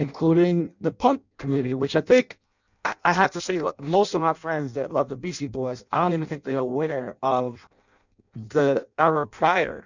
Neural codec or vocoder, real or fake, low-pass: codec, 16 kHz in and 24 kHz out, 0.6 kbps, FireRedTTS-2 codec; fake; 7.2 kHz